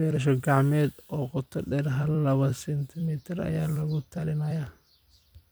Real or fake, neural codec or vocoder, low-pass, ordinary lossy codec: fake; vocoder, 44.1 kHz, 128 mel bands every 256 samples, BigVGAN v2; none; none